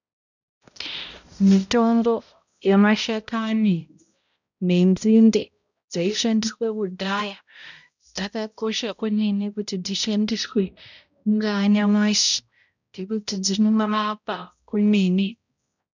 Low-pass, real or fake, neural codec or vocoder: 7.2 kHz; fake; codec, 16 kHz, 0.5 kbps, X-Codec, HuBERT features, trained on balanced general audio